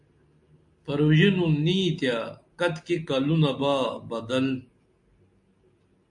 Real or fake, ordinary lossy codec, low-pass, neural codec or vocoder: real; MP3, 64 kbps; 10.8 kHz; none